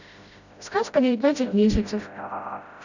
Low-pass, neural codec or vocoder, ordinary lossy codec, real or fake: 7.2 kHz; codec, 16 kHz, 0.5 kbps, FreqCodec, smaller model; none; fake